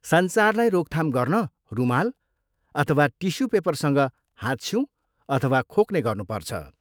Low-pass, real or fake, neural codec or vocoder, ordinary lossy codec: none; fake; autoencoder, 48 kHz, 128 numbers a frame, DAC-VAE, trained on Japanese speech; none